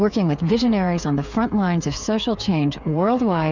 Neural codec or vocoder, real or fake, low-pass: codec, 16 kHz, 8 kbps, FreqCodec, smaller model; fake; 7.2 kHz